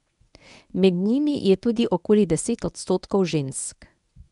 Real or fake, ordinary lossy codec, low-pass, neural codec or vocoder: fake; none; 10.8 kHz; codec, 24 kHz, 0.9 kbps, WavTokenizer, medium speech release version 1